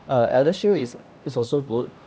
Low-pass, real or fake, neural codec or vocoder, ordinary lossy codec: none; fake; codec, 16 kHz, 1 kbps, X-Codec, HuBERT features, trained on LibriSpeech; none